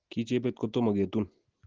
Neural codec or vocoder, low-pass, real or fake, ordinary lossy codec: none; 7.2 kHz; real; Opus, 16 kbps